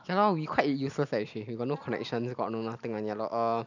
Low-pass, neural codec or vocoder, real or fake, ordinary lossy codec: 7.2 kHz; none; real; none